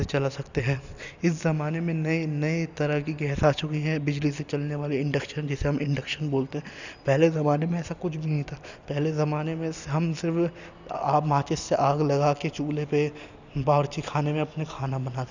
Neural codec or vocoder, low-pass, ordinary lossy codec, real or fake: none; 7.2 kHz; none; real